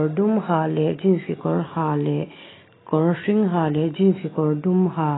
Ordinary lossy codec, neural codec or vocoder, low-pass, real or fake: AAC, 16 kbps; none; 7.2 kHz; real